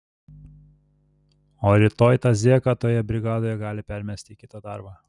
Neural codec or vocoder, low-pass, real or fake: none; 10.8 kHz; real